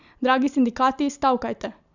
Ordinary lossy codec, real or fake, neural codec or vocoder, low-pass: none; real; none; 7.2 kHz